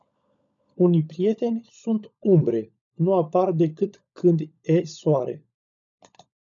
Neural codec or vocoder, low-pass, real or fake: codec, 16 kHz, 16 kbps, FunCodec, trained on LibriTTS, 50 frames a second; 7.2 kHz; fake